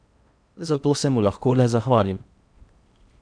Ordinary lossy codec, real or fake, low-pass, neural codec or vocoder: none; fake; 9.9 kHz; codec, 16 kHz in and 24 kHz out, 0.8 kbps, FocalCodec, streaming, 65536 codes